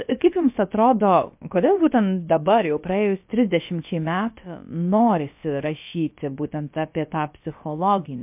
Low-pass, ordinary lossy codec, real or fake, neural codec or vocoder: 3.6 kHz; MP3, 32 kbps; fake; codec, 16 kHz, about 1 kbps, DyCAST, with the encoder's durations